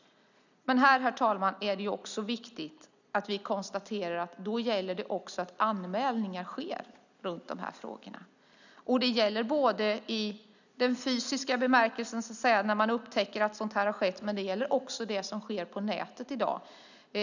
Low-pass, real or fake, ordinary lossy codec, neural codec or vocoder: 7.2 kHz; real; none; none